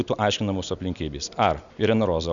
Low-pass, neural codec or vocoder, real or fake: 7.2 kHz; none; real